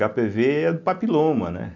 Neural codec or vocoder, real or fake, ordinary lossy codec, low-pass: none; real; none; 7.2 kHz